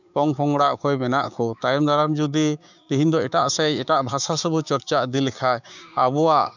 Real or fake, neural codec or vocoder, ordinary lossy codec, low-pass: fake; codec, 16 kHz, 6 kbps, DAC; none; 7.2 kHz